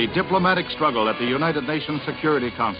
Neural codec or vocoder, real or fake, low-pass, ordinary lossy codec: none; real; 5.4 kHz; MP3, 48 kbps